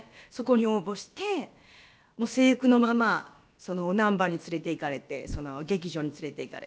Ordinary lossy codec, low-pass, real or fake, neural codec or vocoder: none; none; fake; codec, 16 kHz, about 1 kbps, DyCAST, with the encoder's durations